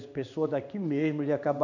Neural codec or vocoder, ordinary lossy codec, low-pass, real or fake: codec, 16 kHz in and 24 kHz out, 1 kbps, XY-Tokenizer; none; 7.2 kHz; fake